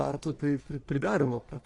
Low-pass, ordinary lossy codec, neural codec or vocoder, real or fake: 10.8 kHz; AAC, 48 kbps; codec, 44.1 kHz, 1.7 kbps, Pupu-Codec; fake